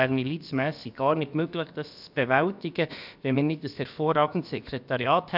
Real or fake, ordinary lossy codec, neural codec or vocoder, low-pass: fake; none; codec, 16 kHz, about 1 kbps, DyCAST, with the encoder's durations; 5.4 kHz